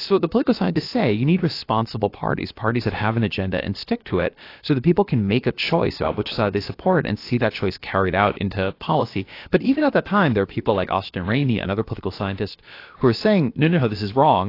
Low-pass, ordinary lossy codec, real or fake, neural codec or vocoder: 5.4 kHz; AAC, 32 kbps; fake; codec, 16 kHz, about 1 kbps, DyCAST, with the encoder's durations